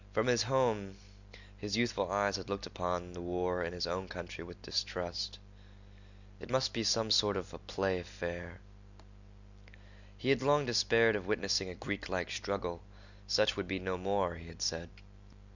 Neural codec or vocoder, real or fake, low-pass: none; real; 7.2 kHz